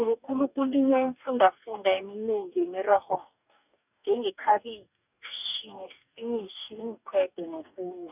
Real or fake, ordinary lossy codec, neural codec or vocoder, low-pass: fake; none; codec, 44.1 kHz, 2.6 kbps, DAC; 3.6 kHz